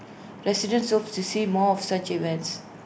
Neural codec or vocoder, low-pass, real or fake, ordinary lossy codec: none; none; real; none